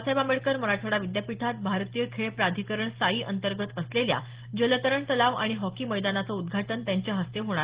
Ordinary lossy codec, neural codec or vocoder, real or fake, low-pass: Opus, 16 kbps; none; real; 3.6 kHz